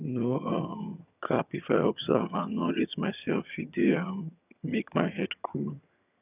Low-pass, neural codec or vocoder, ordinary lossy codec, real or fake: 3.6 kHz; vocoder, 22.05 kHz, 80 mel bands, HiFi-GAN; none; fake